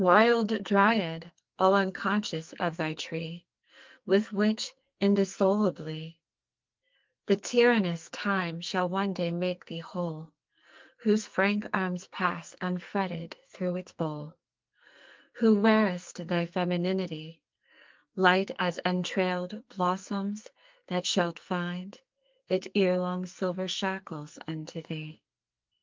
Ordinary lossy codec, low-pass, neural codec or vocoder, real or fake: Opus, 24 kbps; 7.2 kHz; codec, 44.1 kHz, 2.6 kbps, SNAC; fake